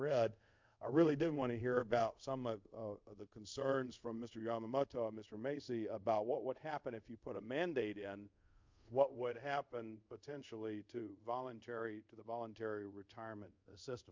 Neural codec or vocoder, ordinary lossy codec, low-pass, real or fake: codec, 24 kHz, 0.5 kbps, DualCodec; MP3, 64 kbps; 7.2 kHz; fake